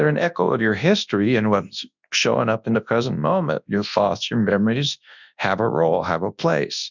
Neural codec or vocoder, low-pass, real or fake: codec, 24 kHz, 0.9 kbps, WavTokenizer, large speech release; 7.2 kHz; fake